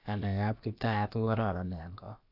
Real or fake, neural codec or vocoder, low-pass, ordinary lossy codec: fake; codec, 16 kHz, about 1 kbps, DyCAST, with the encoder's durations; 5.4 kHz; AAC, 48 kbps